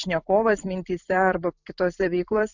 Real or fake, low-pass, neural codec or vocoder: fake; 7.2 kHz; vocoder, 44.1 kHz, 128 mel bands every 256 samples, BigVGAN v2